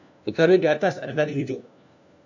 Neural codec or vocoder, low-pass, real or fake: codec, 16 kHz, 1 kbps, FunCodec, trained on LibriTTS, 50 frames a second; 7.2 kHz; fake